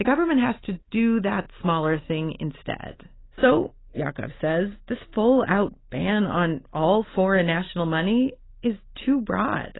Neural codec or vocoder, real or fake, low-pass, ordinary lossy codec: none; real; 7.2 kHz; AAC, 16 kbps